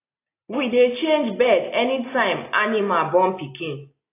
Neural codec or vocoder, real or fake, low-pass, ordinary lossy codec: none; real; 3.6 kHz; AAC, 24 kbps